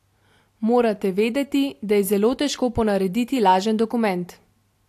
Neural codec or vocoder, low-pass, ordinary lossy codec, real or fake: none; 14.4 kHz; AAC, 64 kbps; real